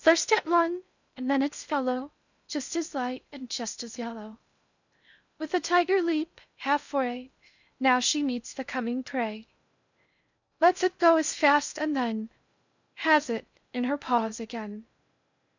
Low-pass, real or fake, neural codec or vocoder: 7.2 kHz; fake; codec, 16 kHz in and 24 kHz out, 0.6 kbps, FocalCodec, streaming, 2048 codes